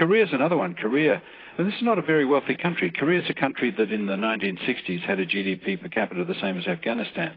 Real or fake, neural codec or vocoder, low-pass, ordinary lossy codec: fake; vocoder, 44.1 kHz, 128 mel bands, Pupu-Vocoder; 5.4 kHz; AAC, 24 kbps